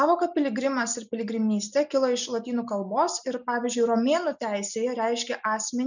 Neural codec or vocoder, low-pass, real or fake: none; 7.2 kHz; real